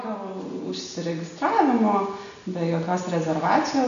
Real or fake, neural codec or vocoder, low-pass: real; none; 7.2 kHz